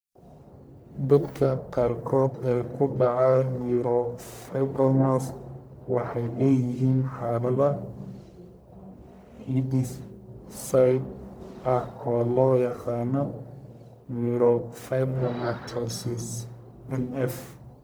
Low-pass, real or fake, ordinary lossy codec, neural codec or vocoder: none; fake; none; codec, 44.1 kHz, 1.7 kbps, Pupu-Codec